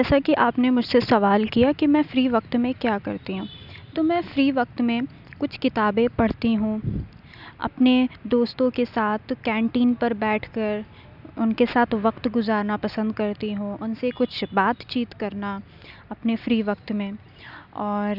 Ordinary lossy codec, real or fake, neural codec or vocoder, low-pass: none; real; none; 5.4 kHz